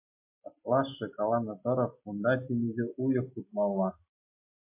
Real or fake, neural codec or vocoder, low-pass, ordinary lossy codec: real; none; 3.6 kHz; MP3, 24 kbps